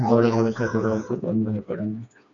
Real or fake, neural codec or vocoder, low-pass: fake; codec, 16 kHz, 2 kbps, FreqCodec, smaller model; 7.2 kHz